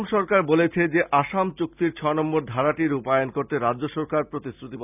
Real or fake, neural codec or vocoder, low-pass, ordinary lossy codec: real; none; 3.6 kHz; none